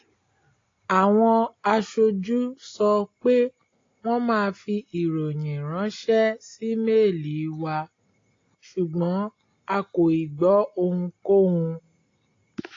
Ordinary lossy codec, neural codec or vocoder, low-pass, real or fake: AAC, 32 kbps; none; 7.2 kHz; real